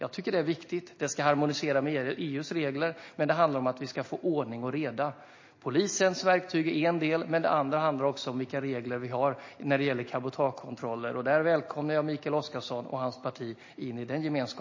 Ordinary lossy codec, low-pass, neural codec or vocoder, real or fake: MP3, 32 kbps; 7.2 kHz; none; real